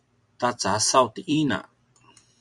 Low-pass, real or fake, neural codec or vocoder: 10.8 kHz; fake; vocoder, 44.1 kHz, 128 mel bands every 256 samples, BigVGAN v2